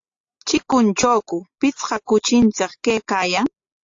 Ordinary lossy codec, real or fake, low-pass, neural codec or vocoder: AAC, 48 kbps; real; 7.2 kHz; none